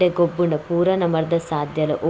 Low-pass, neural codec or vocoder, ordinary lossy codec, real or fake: none; none; none; real